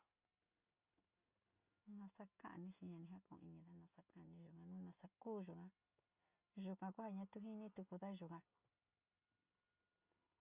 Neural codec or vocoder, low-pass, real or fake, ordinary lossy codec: none; 3.6 kHz; real; Opus, 64 kbps